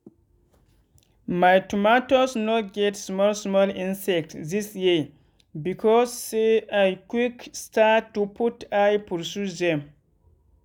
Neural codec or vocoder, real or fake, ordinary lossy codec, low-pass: none; real; none; 19.8 kHz